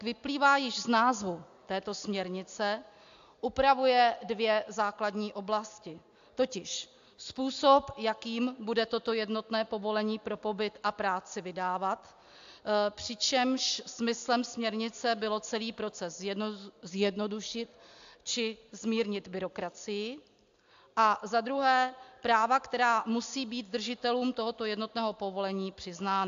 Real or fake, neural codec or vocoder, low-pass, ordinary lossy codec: real; none; 7.2 kHz; AAC, 64 kbps